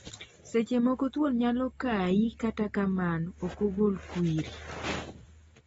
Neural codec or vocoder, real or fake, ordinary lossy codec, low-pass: none; real; AAC, 24 kbps; 19.8 kHz